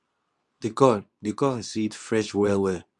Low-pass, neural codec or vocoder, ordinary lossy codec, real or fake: 10.8 kHz; codec, 24 kHz, 0.9 kbps, WavTokenizer, medium speech release version 2; none; fake